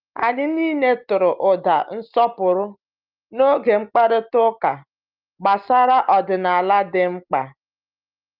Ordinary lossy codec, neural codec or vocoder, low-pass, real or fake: Opus, 24 kbps; none; 5.4 kHz; real